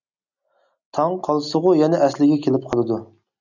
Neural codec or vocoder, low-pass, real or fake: none; 7.2 kHz; real